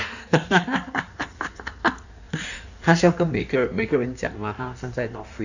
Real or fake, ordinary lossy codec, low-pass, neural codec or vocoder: fake; none; 7.2 kHz; codec, 16 kHz in and 24 kHz out, 1.1 kbps, FireRedTTS-2 codec